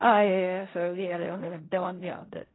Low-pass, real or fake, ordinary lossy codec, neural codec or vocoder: 7.2 kHz; fake; AAC, 16 kbps; codec, 16 kHz in and 24 kHz out, 0.4 kbps, LongCat-Audio-Codec, fine tuned four codebook decoder